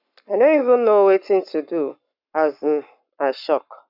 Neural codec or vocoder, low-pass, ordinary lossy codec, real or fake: codec, 44.1 kHz, 7.8 kbps, Pupu-Codec; 5.4 kHz; none; fake